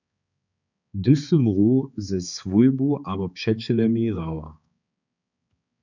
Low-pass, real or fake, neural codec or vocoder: 7.2 kHz; fake; codec, 16 kHz, 4 kbps, X-Codec, HuBERT features, trained on balanced general audio